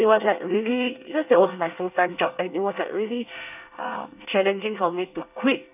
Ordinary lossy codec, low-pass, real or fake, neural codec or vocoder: none; 3.6 kHz; fake; codec, 24 kHz, 1 kbps, SNAC